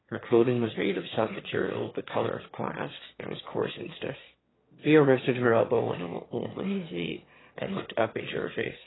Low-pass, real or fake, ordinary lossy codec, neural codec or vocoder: 7.2 kHz; fake; AAC, 16 kbps; autoencoder, 22.05 kHz, a latent of 192 numbers a frame, VITS, trained on one speaker